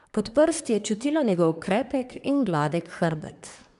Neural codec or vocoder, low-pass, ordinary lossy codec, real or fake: codec, 24 kHz, 1 kbps, SNAC; 10.8 kHz; none; fake